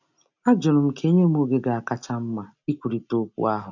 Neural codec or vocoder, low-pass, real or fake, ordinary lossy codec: none; 7.2 kHz; real; none